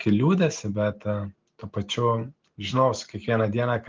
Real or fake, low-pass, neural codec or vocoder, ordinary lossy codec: real; 7.2 kHz; none; Opus, 32 kbps